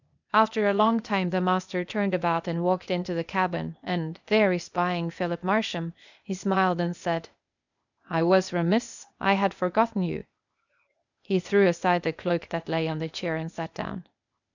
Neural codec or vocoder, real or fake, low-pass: codec, 16 kHz, 0.8 kbps, ZipCodec; fake; 7.2 kHz